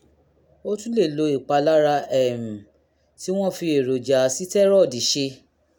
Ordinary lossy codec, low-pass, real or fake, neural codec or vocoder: none; 19.8 kHz; real; none